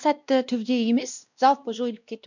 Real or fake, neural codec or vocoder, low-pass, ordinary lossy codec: fake; codec, 16 kHz, 1 kbps, X-Codec, WavLM features, trained on Multilingual LibriSpeech; 7.2 kHz; none